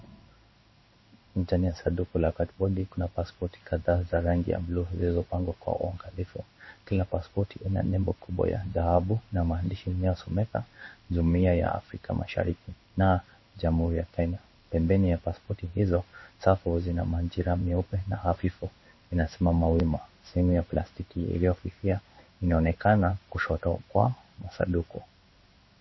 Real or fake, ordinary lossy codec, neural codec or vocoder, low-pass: fake; MP3, 24 kbps; codec, 16 kHz in and 24 kHz out, 1 kbps, XY-Tokenizer; 7.2 kHz